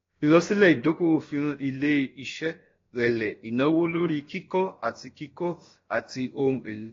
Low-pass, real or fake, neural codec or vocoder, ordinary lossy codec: 7.2 kHz; fake; codec, 16 kHz, about 1 kbps, DyCAST, with the encoder's durations; AAC, 32 kbps